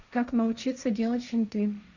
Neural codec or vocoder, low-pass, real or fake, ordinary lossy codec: codec, 16 kHz, 1.1 kbps, Voila-Tokenizer; 7.2 kHz; fake; none